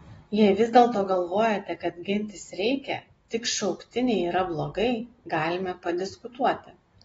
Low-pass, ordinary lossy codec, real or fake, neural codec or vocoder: 19.8 kHz; AAC, 24 kbps; real; none